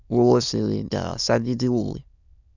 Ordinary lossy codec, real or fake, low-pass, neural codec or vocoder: none; fake; 7.2 kHz; autoencoder, 22.05 kHz, a latent of 192 numbers a frame, VITS, trained on many speakers